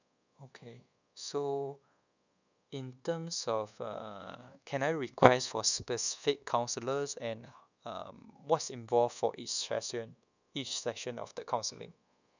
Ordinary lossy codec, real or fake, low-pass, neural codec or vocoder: none; fake; 7.2 kHz; codec, 24 kHz, 1.2 kbps, DualCodec